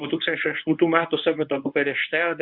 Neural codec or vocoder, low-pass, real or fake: codec, 24 kHz, 0.9 kbps, WavTokenizer, medium speech release version 1; 5.4 kHz; fake